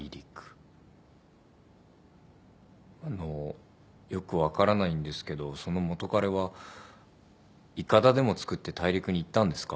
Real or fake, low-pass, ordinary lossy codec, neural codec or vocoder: real; none; none; none